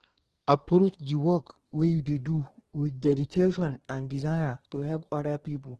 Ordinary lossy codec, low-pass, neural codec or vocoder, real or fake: Opus, 16 kbps; 10.8 kHz; codec, 24 kHz, 1 kbps, SNAC; fake